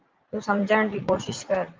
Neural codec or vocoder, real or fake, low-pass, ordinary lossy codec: vocoder, 44.1 kHz, 80 mel bands, Vocos; fake; 7.2 kHz; Opus, 24 kbps